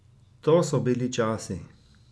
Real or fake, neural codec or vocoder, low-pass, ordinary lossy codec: real; none; none; none